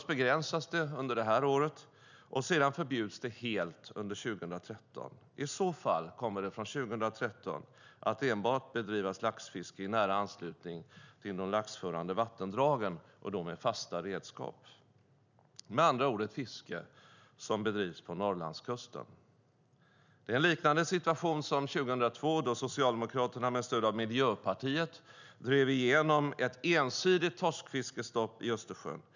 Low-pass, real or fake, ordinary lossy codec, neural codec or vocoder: 7.2 kHz; real; none; none